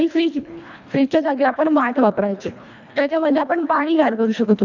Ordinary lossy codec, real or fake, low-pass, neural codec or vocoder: none; fake; 7.2 kHz; codec, 24 kHz, 1.5 kbps, HILCodec